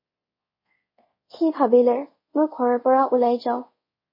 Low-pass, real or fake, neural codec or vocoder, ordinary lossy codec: 5.4 kHz; fake; codec, 24 kHz, 0.5 kbps, DualCodec; MP3, 24 kbps